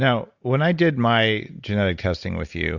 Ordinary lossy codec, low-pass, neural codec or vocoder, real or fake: Opus, 64 kbps; 7.2 kHz; none; real